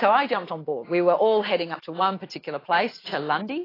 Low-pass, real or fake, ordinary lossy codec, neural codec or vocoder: 5.4 kHz; real; AAC, 24 kbps; none